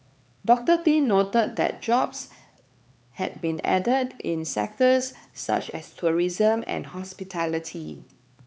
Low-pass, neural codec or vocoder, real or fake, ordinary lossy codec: none; codec, 16 kHz, 4 kbps, X-Codec, HuBERT features, trained on LibriSpeech; fake; none